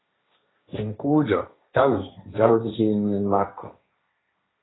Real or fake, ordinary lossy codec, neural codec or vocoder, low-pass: fake; AAC, 16 kbps; codec, 16 kHz, 1.1 kbps, Voila-Tokenizer; 7.2 kHz